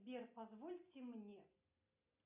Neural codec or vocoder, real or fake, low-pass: none; real; 3.6 kHz